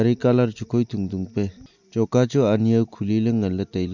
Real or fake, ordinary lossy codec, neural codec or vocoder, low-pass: real; none; none; 7.2 kHz